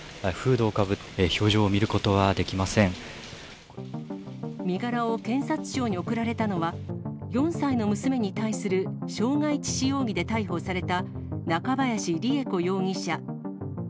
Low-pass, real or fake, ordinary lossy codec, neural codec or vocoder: none; real; none; none